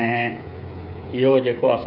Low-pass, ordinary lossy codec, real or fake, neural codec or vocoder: 5.4 kHz; none; fake; codec, 16 kHz, 8 kbps, FreqCodec, smaller model